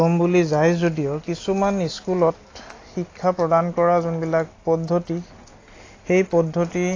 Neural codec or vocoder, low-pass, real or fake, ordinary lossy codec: none; 7.2 kHz; real; AAC, 32 kbps